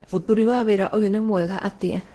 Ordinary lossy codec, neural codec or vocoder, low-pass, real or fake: Opus, 16 kbps; codec, 16 kHz in and 24 kHz out, 0.8 kbps, FocalCodec, streaming, 65536 codes; 10.8 kHz; fake